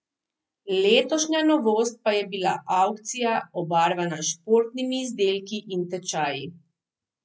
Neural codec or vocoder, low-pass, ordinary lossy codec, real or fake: none; none; none; real